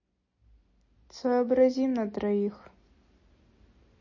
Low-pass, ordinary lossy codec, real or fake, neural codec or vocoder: 7.2 kHz; MP3, 32 kbps; real; none